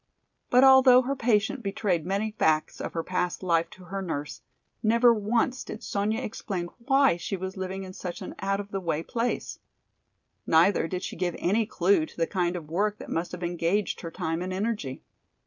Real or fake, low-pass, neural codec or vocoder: real; 7.2 kHz; none